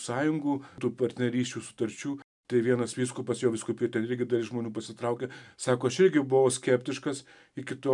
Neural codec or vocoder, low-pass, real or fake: none; 10.8 kHz; real